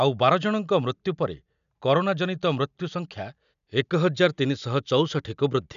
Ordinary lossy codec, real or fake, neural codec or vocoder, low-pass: none; real; none; 7.2 kHz